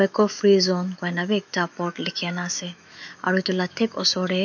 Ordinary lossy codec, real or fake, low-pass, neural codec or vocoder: none; fake; 7.2 kHz; autoencoder, 48 kHz, 128 numbers a frame, DAC-VAE, trained on Japanese speech